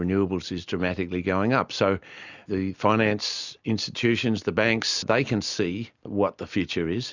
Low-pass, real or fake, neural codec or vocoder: 7.2 kHz; real; none